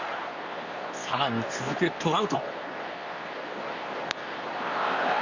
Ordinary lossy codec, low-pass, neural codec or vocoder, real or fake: Opus, 64 kbps; 7.2 kHz; codec, 24 kHz, 0.9 kbps, WavTokenizer, medium speech release version 2; fake